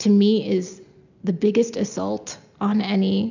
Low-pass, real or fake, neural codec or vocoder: 7.2 kHz; real; none